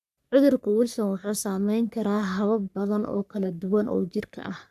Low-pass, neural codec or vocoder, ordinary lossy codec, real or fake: 14.4 kHz; codec, 44.1 kHz, 3.4 kbps, Pupu-Codec; none; fake